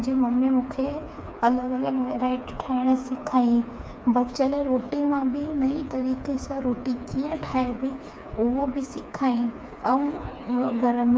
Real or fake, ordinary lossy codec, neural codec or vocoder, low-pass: fake; none; codec, 16 kHz, 4 kbps, FreqCodec, smaller model; none